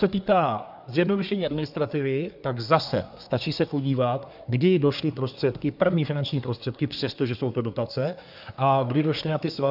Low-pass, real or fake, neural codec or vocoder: 5.4 kHz; fake; codec, 24 kHz, 1 kbps, SNAC